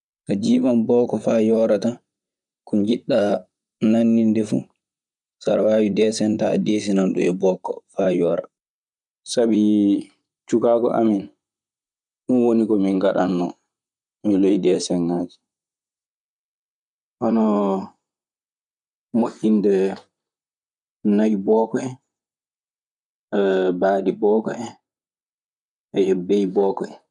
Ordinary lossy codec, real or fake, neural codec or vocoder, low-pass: none; fake; vocoder, 44.1 kHz, 128 mel bands, Pupu-Vocoder; 10.8 kHz